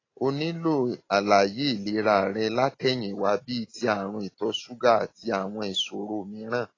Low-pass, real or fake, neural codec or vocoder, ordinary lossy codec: 7.2 kHz; real; none; AAC, 32 kbps